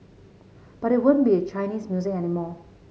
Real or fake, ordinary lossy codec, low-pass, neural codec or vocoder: real; none; none; none